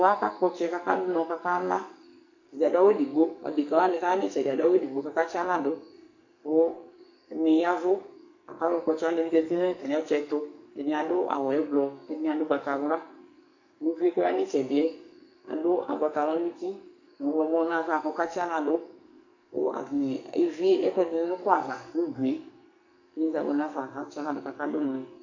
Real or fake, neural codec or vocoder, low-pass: fake; codec, 44.1 kHz, 2.6 kbps, SNAC; 7.2 kHz